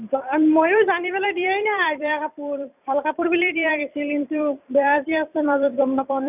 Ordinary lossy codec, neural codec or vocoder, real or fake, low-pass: none; vocoder, 44.1 kHz, 128 mel bands every 256 samples, BigVGAN v2; fake; 3.6 kHz